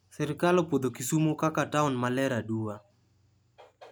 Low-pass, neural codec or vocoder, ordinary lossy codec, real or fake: none; none; none; real